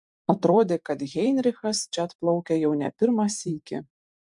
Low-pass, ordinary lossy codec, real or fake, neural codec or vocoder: 10.8 kHz; MP3, 64 kbps; fake; vocoder, 44.1 kHz, 128 mel bands every 512 samples, BigVGAN v2